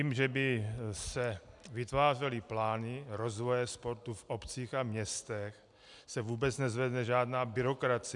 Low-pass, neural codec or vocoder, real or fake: 10.8 kHz; none; real